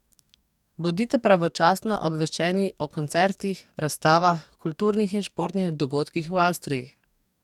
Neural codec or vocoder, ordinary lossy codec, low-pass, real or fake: codec, 44.1 kHz, 2.6 kbps, DAC; none; 19.8 kHz; fake